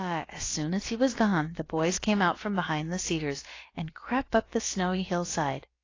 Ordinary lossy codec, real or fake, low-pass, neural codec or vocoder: AAC, 32 kbps; fake; 7.2 kHz; codec, 16 kHz, about 1 kbps, DyCAST, with the encoder's durations